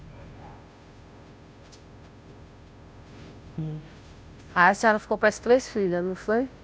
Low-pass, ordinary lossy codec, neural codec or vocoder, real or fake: none; none; codec, 16 kHz, 0.5 kbps, FunCodec, trained on Chinese and English, 25 frames a second; fake